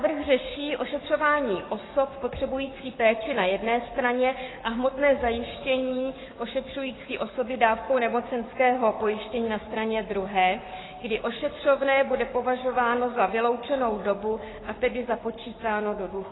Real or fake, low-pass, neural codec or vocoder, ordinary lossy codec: fake; 7.2 kHz; codec, 44.1 kHz, 7.8 kbps, Pupu-Codec; AAC, 16 kbps